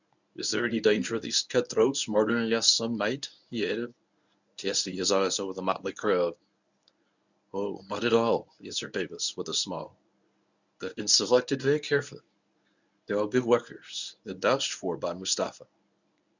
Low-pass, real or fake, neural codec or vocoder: 7.2 kHz; fake; codec, 24 kHz, 0.9 kbps, WavTokenizer, medium speech release version 1